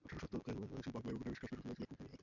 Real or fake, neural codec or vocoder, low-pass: real; none; 7.2 kHz